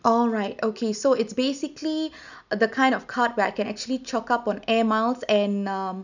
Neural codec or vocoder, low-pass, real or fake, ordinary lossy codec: none; 7.2 kHz; real; none